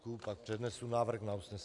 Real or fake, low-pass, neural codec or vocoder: real; 10.8 kHz; none